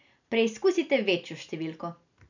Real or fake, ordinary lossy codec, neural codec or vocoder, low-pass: real; none; none; 7.2 kHz